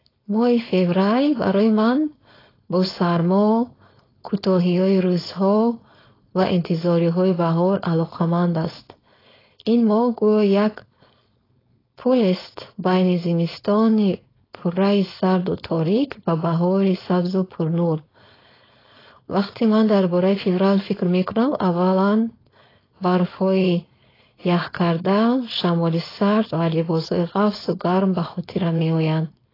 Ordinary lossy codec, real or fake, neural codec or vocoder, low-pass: AAC, 24 kbps; fake; codec, 16 kHz, 4.8 kbps, FACodec; 5.4 kHz